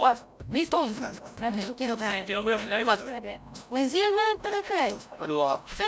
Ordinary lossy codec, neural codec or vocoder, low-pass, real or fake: none; codec, 16 kHz, 0.5 kbps, FreqCodec, larger model; none; fake